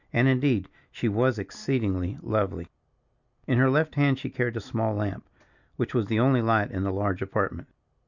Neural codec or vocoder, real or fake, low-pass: none; real; 7.2 kHz